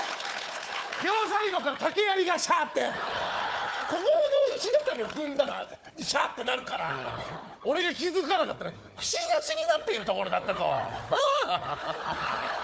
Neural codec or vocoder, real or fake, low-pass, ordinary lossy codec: codec, 16 kHz, 4 kbps, FunCodec, trained on Chinese and English, 50 frames a second; fake; none; none